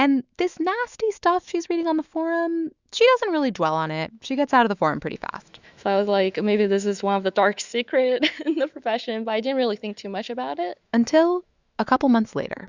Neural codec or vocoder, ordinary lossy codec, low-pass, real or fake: autoencoder, 48 kHz, 128 numbers a frame, DAC-VAE, trained on Japanese speech; Opus, 64 kbps; 7.2 kHz; fake